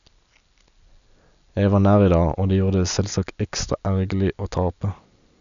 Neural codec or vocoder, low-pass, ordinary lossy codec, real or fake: none; 7.2 kHz; MP3, 96 kbps; real